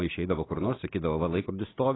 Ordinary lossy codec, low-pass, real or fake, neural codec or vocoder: AAC, 16 kbps; 7.2 kHz; real; none